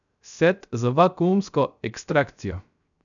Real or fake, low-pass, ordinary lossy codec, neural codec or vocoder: fake; 7.2 kHz; none; codec, 16 kHz, 0.3 kbps, FocalCodec